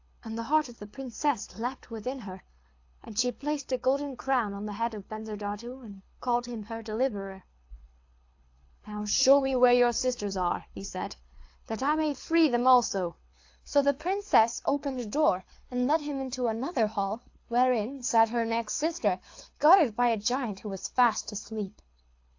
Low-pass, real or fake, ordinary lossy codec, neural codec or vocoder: 7.2 kHz; fake; AAC, 48 kbps; codec, 24 kHz, 6 kbps, HILCodec